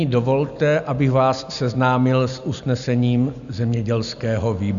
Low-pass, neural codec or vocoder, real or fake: 7.2 kHz; none; real